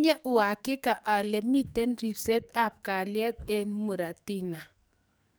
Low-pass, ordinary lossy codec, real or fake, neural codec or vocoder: none; none; fake; codec, 44.1 kHz, 2.6 kbps, SNAC